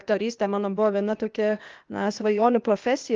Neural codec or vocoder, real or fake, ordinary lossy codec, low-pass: codec, 16 kHz, 0.8 kbps, ZipCodec; fake; Opus, 32 kbps; 7.2 kHz